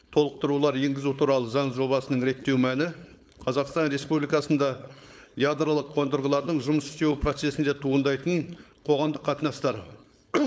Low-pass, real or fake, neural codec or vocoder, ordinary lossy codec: none; fake; codec, 16 kHz, 4.8 kbps, FACodec; none